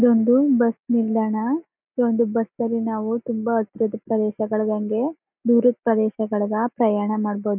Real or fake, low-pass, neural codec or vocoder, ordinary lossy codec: real; 3.6 kHz; none; none